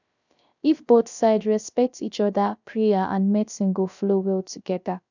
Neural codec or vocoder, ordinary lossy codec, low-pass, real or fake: codec, 16 kHz, 0.3 kbps, FocalCodec; none; 7.2 kHz; fake